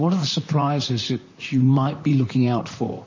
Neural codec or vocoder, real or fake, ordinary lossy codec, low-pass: vocoder, 44.1 kHz, 128 mel bands, Pupu-Vocoder; fake; MP3, 32 kbps; 7.2 kHz